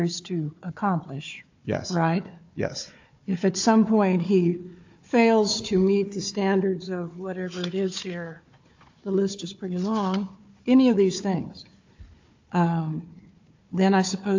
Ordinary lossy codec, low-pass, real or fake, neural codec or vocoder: AAC, 48 kbps; 7.2 kHz; fake; codec, 16 kHz, 4 kbps, FunCodec, trained on Chinese and English, 50 frames a second